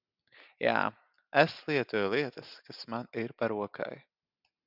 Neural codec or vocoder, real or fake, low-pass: none; real; 5.4 kHz